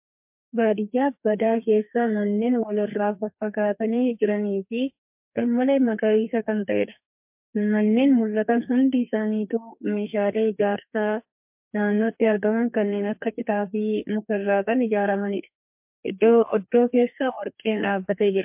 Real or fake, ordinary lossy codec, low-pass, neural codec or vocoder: fake; MP3, 32 kbps; 3.6 kHz; codec, 32 kHz, 1.9 kbps, SNAC